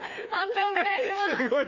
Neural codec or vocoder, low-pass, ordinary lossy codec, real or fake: codec, 16 kHz, 2 kbps, FreqCodec, larger model; 7.2 kHz; none; fake